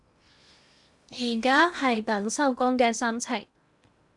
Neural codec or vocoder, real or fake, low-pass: codec, 16 kHz in and 24 kHz out, 0.8 kbps, FocalCodec, streaming, 65536 codes; fake; 10.8 kHz